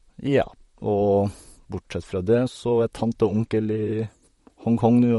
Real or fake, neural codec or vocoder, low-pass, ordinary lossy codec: fake; vocoder, 44.1 kHz, 128 mel bands, Pupu-Vocoder; 19.8 kHz; MP3, 48 kbps